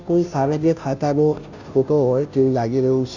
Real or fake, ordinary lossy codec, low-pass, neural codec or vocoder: fake; none; 7.2 kHz; codec, 16 kHz, 0.5 kbps, FunCodec, trained on Chinese and English, 25 frames a second